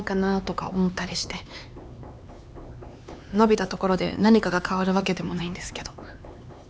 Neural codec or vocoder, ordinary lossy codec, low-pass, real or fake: codec, 16 kHz, 4 kbps, X-Codec, HuBERT features, trained on LibriSpeech; none; none; fake